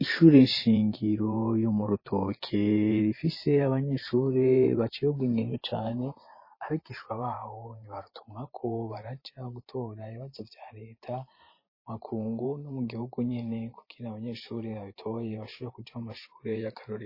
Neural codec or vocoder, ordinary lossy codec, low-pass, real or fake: vocoder, 44.1 kHz, 128 mel bands every 512 samples, BigVGAN v2; MP3, 24 kbps; 5.4 kHz; fake